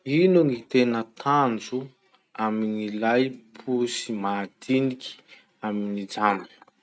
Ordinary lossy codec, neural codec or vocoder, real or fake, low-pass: none; none; real; none